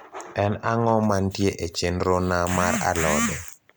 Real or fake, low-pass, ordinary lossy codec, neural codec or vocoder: real; none; none; none